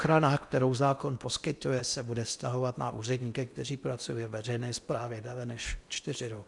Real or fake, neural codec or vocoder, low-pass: fake; codec, 16 kHz in and 24 kHz out, 0.8 kbps, FocalCodec, streaming, 65536 codes; 10.8 kHz